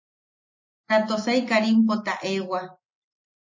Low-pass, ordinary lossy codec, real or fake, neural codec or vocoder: 7.2 kHz; MP3, 32 kbps; real; none